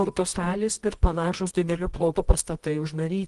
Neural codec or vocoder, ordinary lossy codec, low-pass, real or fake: codec, 24 kHz, 0.9 kbps, WavTokenizer, medium music audio release; Opus, 24 kbps; 10.8 kHz; fake